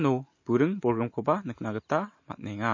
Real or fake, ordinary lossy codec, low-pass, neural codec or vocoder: real; MP3, 32 kbps; 7.2 kHz; none